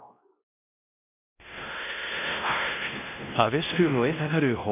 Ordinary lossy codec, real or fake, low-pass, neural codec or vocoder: none; fake; 3.6 kHz; codec, 16 kHz, 1 kbps, X-Codec, WavLM features, trained on Multilingual LibriSpeech